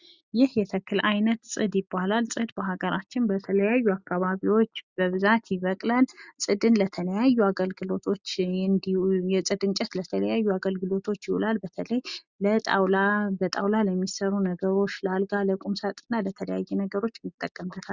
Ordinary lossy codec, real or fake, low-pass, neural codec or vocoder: Opus, 64 kbps; real; 7.2 kHz; none